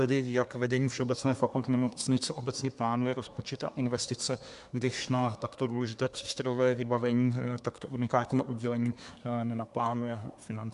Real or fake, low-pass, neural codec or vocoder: fake; 10.8 kHz; codec, 24 kHz, 1 kbps, SNAC